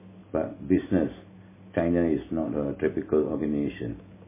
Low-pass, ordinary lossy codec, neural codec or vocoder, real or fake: 3.6 kHz; MP3, 16 kbps; none; real